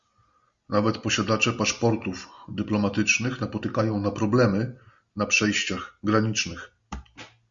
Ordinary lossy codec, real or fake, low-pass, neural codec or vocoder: Opus, 64 kbps; real; 7.2 kHz; none